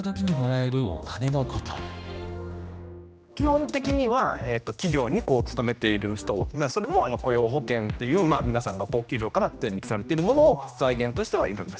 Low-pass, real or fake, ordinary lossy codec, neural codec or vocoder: none; fake; none; codec, 16 kHz, 1 kbps, X-Codec, HuBERT features, trained on general audio